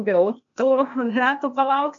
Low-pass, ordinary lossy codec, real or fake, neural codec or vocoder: 7.2 kHz; MP3, 48 kbps; fake; codec, 16 kHz, 0.8 kbps, ZipCodec